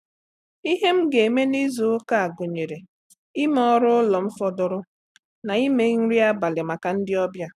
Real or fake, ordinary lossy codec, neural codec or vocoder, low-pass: real; none; none; 14.4 kHz